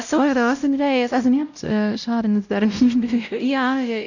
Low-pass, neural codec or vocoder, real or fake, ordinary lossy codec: 7.2 kHz; codec, 16 kHz, 0.5 kbps, X-Codec, WavLM features, trained on Multilingual LibriSpeech; fake; none